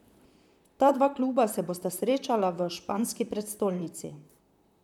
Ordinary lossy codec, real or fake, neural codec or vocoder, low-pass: none; fake; vocoder, 44.1 kHz, 128 mel bands, Pupu-Vocoder; 19.8 kHz